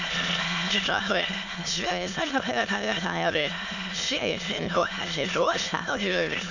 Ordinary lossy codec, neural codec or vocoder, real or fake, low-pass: none; autoencoder, 22.05 kHz, a latent of 192 numbers a frame, VITS, trained on many speakers; fake; 7.2 kHz